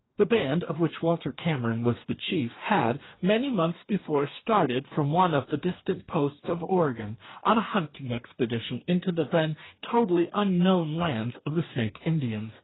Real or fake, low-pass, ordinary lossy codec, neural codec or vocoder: fake; 7.2 kHz; AAC, 16 kbps; codec, 44.1 kHz, 2.6 kbps, DAC